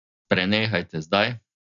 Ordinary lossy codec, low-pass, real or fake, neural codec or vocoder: none; 7.2 kHz; real; none